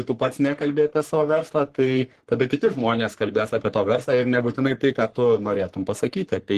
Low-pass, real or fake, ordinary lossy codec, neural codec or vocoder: 14.4 kHz; fake; Opus, 16 kbps; codec, 44.1 kHz, 3.4 kbps, Pupu-Codec